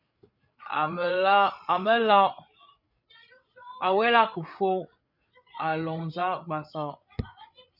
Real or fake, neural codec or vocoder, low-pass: fake; codec, 16 kHz, 8 kbps, FreqCodec, larger model; 5.4 kHz